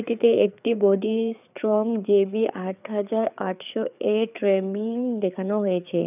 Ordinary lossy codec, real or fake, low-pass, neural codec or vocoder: none; fake; 3.6 kHz; codec, 16 kHz, 4 kbps, FreqCodec, larger model